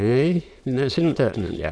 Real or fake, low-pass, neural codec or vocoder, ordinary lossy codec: fake; none; autoencoder, 22.05 kHz, a latent of 192 numbers a frame, VITS, trained on many speakers; none